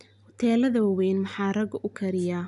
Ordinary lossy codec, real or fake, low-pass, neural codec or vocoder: none; real; 10.8 kHz; none